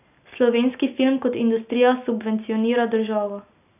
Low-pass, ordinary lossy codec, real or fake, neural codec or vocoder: 3.6 kHz; none; real; none